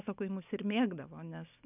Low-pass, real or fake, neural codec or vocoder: 3.6 kHz; real; none